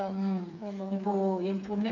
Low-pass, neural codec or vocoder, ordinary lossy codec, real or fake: 7.2 kHz; codec, 16 kHz, 4 kbps, FreqCodec, smaller model; none; fake